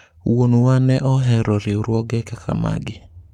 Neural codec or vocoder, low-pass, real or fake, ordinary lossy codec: codec, 44.1 kHz, 7.8 kbps, Pupu-Codec; 19.8 kHz; fake; none